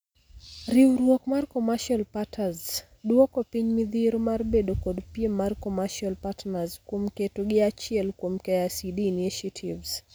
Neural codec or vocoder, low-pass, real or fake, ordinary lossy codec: none; none; real; none